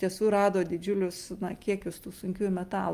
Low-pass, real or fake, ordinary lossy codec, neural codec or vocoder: 14.4 kHz; real; Opus, 32 kbps; none